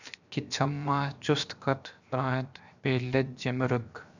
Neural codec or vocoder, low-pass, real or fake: codec, 16 kHz, 0.7 kbps, FocalCodec; 7.2 kHz; fake